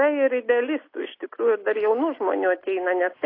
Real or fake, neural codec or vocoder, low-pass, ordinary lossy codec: real; none; 5.4 kHz; AAC, 32 kbps